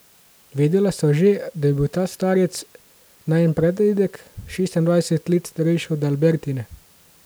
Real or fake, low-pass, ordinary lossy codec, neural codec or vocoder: real; none; none; none